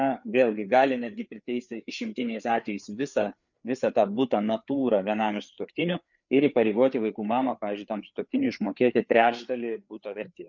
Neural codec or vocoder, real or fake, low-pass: codec, 16 kHz, 4 kbps, FreqCodec, larger model; fake; 7.2 kHz